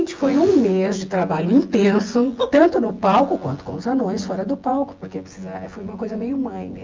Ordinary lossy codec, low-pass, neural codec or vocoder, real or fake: Opus, 32 kbps; 7.2 kHz; vocoder, 24 kHz, 100 mel bands, Vocos; fake